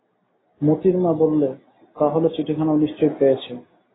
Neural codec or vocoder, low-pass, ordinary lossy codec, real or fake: none; 7.2 kHz; AAC, 16 kbps; real